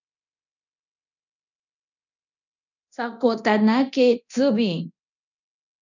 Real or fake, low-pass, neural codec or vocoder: fake; 7.2 kHz; codec, 24 kHz, 0.9 kbps, DualCodec